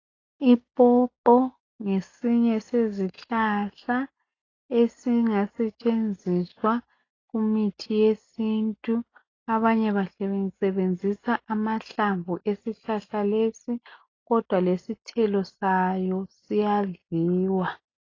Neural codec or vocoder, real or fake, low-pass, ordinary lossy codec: none; real; 7.2 kHz; AAC, 32 kbps